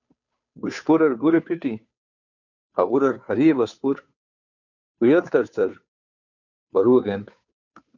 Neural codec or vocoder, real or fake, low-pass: codec, 16 kHz, 2 kbps, FunCodec, trained on Chinese and English, 25 frames a second; fake; 7.2 kHz